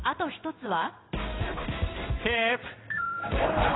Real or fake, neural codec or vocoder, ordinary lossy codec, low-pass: fake; codec, 16 kHz in and 24 kHz out, 1 kbps, XY-Tokenizer; AAC, 16 kbps; 7.2 kHz